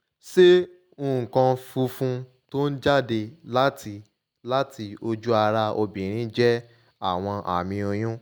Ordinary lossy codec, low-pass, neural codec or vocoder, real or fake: none; none; none; real